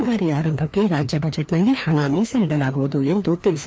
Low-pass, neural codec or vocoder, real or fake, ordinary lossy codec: none; codec, 16 kHz, 2 kbps, FreqCodec, larger model; fake; none